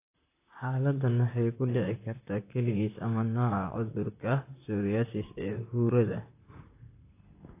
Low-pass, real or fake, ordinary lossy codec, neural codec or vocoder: 3.6 kHz; fake; AAC, 24 kbps; vocoder, 44.1 kHz, 128 mel bands, Pupu-Vocoder